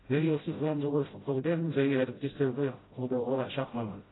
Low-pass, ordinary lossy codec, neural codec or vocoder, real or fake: 7.2 kHz; AAC, 16 kbps; codec, 16 kHz, 0.5 kbps, FreqCodec, smaller model; fake